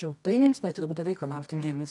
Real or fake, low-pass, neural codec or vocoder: fake; 10.8 kHz; codec, 24 kHz, 0.9 kbps, WavTokenizer, medium music audio release